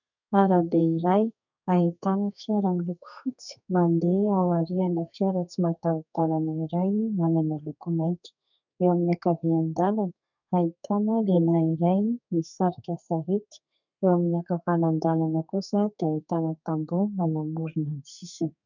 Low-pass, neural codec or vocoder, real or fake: 7.2 kHz; codec, 32 kHz, 1.9 kbps, SNAC; fake